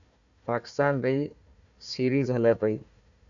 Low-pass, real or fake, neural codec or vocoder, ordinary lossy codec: 7.2 kHz; fake; codec, 16 kHz, 1 kbps, FunCodec, trained on Chinese and English, 50 frames a second; MP3, 96 kbps